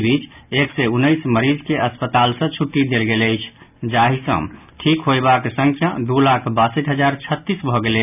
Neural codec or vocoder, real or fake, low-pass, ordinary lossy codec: none; real; 3.6 kHz; none